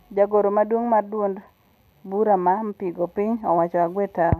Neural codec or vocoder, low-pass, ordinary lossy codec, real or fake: none; 14.4 kHz; none; real